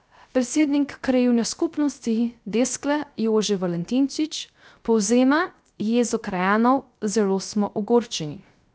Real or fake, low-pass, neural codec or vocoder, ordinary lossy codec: fake; none; codec, 16 kHz, 0.3 kbps, FocalCodec; none